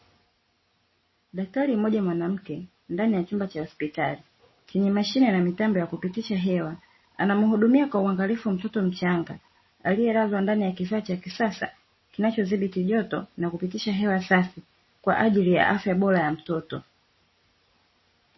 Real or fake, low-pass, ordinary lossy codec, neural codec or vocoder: real; 7.2 kHz; MP3, 24 kbps; none